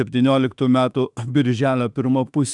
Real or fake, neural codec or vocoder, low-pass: fake; autoencoder, 48 kHz, 32 numbers a frame, DAC-VAE, trained on Japanese speech; 10.8 kHz